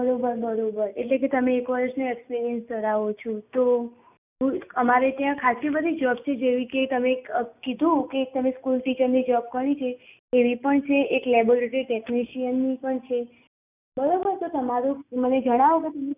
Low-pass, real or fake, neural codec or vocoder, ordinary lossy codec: 3.6 kHz; real; none; none